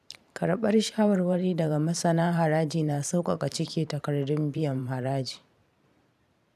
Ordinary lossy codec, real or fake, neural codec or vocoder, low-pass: none; fake; vocoder, 44.1 kHz, 128 mel bands every 512 samples, BigVGAN v2; 14.4 kHz